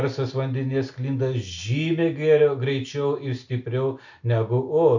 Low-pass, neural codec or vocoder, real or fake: 7.2 kHz; none; real